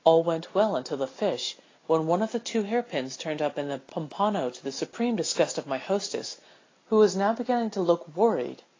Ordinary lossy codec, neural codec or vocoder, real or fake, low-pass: AAC, 32 kbps; none; real; 7.2 kHz